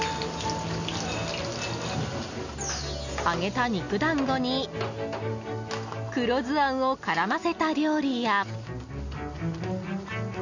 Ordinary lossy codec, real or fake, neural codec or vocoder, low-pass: none; real; none; 7.2 kHz